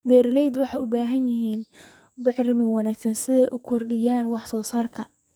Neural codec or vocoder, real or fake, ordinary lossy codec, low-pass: codec, 44.1 kHz, 2.6 kbps, SNAC; fake; none; none